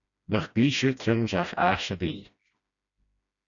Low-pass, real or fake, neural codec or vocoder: 7.2 kHz; fake; codec, 16 kHz, 1 kbps, FreqCodec, smaller model